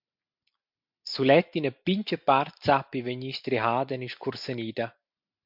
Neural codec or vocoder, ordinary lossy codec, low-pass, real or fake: none; MP3, 48 kbps; 5.4 kHz; real